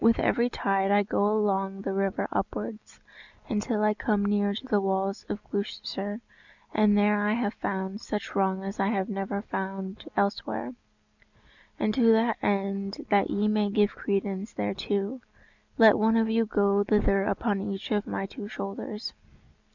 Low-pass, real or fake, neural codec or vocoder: 7.2 kHz; real; none